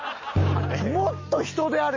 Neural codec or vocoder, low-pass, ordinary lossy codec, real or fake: none; 7.2 kHz; MP3, 32 kbps; real